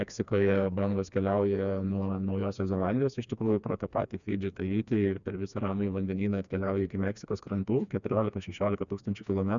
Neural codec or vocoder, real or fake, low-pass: codec, 16 kHz, 2 kbps, FreqCodec, smaller model; fake; 7.2 kHz